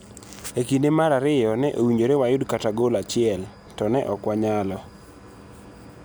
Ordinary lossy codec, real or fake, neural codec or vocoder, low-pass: none; real; none; none